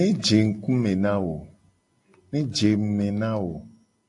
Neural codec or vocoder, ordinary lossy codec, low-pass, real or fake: none; AAC, 64 kbps; 10.8 kHz; real